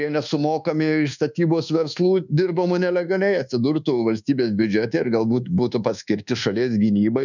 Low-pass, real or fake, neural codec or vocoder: 7.2 kHz; fake; codec, 24 kHz, 1.2 kbps, DualCodec